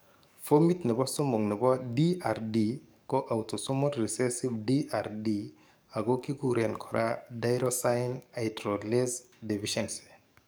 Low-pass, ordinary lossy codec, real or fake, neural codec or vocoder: none; none; fake; codec, 44.1 kHz, 7.8 kbps, DAC